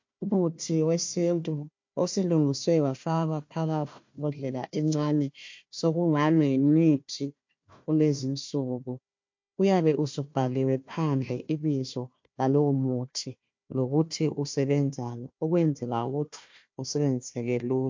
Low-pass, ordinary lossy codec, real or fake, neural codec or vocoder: 7.2 kHz; MP3, 48 kbps; fake; codec, 16 kHz, 1 kbps, FunCodec, trained on Chinese and English, 50 frames a second